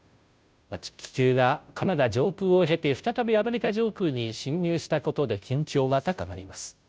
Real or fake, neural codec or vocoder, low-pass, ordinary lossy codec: fake; codec, 16 kHz, 0.5 kbps, FunCodec, trained on Chinese and English, 25 frames a second; none; none